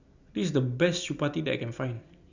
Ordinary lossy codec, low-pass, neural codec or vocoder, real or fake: Opus, 64 kbps; 7.2 kHz; none; real